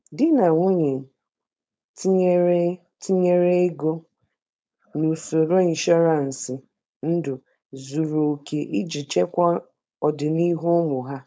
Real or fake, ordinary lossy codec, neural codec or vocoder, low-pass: fake; none; codec, 16 kHz, 4.8 kbps, FACodec; none